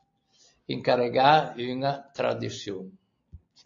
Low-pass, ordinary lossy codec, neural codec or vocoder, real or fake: 7.2 kHz; AAC, 48 kbps; none; real